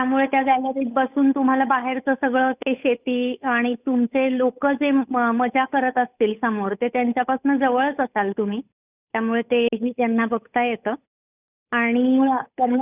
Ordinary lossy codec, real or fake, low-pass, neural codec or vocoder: none; fake; 3.6 kHz; codec, 16 kHz, 8 kbps, FunCodec, trained on Chinese and English, 25 frames a second